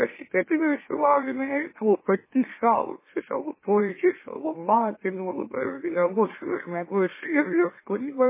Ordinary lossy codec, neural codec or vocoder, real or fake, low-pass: MP3, 16 kbps; autoencoder, 44.1 kHz, a latent of 192 numbers a frame, MeloTTS; fake; 3.6 kHz